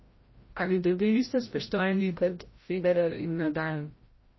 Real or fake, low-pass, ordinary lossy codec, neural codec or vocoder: fake; 7.2 kHz; MP3, 24 kbps; codec, 16 kHz, 0.5 kbps, FreqCodec, larger model